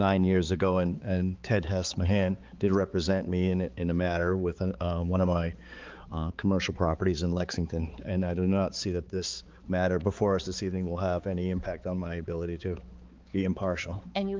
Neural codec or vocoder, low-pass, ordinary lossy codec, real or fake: codec, 16 kHz, 4 kbps, X-Codec, HuBERT features, trained on balanced general audio; 7.2 kHz; Opus, 32 kbps; fake